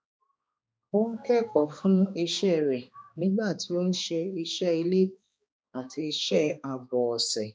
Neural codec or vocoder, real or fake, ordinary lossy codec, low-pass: codec, 16 kHz, 2 kbps, X-Codec, HuBERT features, trained on balanced general audio; fake; none; none